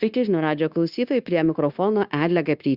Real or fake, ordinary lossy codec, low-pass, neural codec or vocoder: fake; Opus, 64 kbps; 5.4 kHz; codec, 16 kHz, 0.9 kbps, LongCat-Audio-Codec